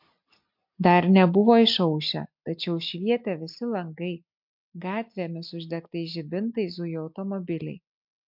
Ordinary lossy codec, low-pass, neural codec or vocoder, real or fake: MP3, 48 kbps; 5.4 kHz; none; real